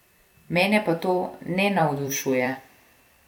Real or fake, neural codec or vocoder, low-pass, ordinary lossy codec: fake; vocoder, 44.1 kHz, 128 mel bands every 256 samples, BigVGAN v2; 19.8 kHz; none